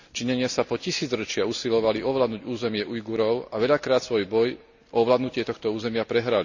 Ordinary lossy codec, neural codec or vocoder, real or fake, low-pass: none; none; real; 7.2 kHz